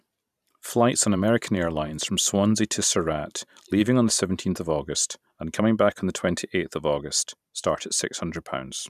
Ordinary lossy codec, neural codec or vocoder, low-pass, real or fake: none; vocoder, 44.1 kHz, 128 mel bands every 512 samples, BigVGAN v2; 14.4 kHz; fake